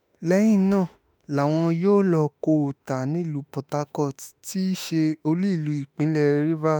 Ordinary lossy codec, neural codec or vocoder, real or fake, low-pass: none; autoencoder, 48 kHz, 32 numbers a frame, DAC-VAE, trained on Japanese speech; fake; none